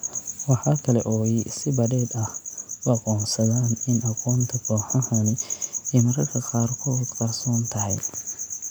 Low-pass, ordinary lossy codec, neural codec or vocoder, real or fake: none; none; none; real